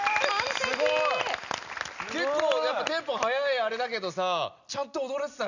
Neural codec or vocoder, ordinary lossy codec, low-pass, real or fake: none; none; 7.2 kHz; real